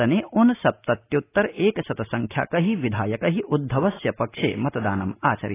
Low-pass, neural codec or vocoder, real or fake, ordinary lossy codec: 3.6 kHz; none; real; AAC, 16 kbps